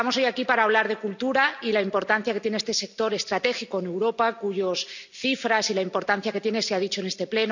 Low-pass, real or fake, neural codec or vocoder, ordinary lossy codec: 7.2 kHz; real; none; none